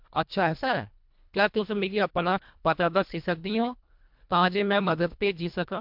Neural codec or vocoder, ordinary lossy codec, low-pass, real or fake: codec, 24 kHz, 1.5 kbps, HILCodec; MP3, 48 kbps; 5.4 kHz; fake